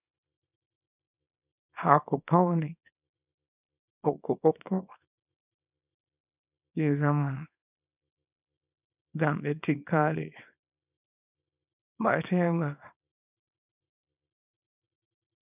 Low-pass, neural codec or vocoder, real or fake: 3.6 kHz; codec, 24 kHz, 0.9 kbps, WavTokenizer, small release; fake